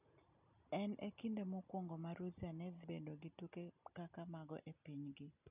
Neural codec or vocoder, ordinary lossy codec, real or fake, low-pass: none; none; real; 3.6 kHz